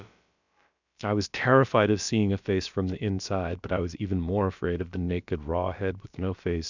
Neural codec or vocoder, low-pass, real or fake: codec, 16 kHz, about 1 kbps, DyCAST, with the encoder's durations; 7.2 kHz; fake